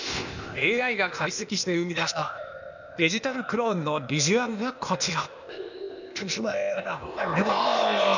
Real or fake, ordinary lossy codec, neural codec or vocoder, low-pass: fake; none; codec, 16 kHz, 0.8 kbps, ZipCodec; 7.2 kHz